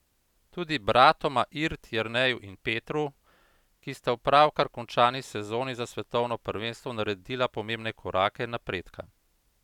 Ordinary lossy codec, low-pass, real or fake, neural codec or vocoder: none; 19.8 kHz; real; none